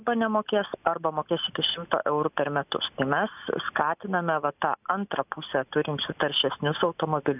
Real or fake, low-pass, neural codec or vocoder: real; 3.6 kHz; none